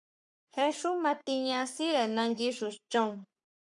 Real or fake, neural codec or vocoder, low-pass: fake; codec, 44.1 kHz, 3.4 kbps, Pupu-Codec; 10.8 kHz